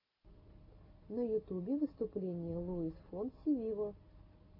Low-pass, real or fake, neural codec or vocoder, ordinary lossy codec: 5.4 kHz; real; none; AAC, 48 kbps